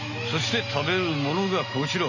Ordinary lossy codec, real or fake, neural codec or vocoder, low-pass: none; real; none; 7.2 kHz